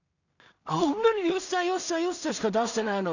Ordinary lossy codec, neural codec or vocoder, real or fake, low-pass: none; codec, 16 kHz in and 24 kHz out, 0.4 kbps, LongCat-Audio-Codec, two codebook decoder; fake; 7.2 kHz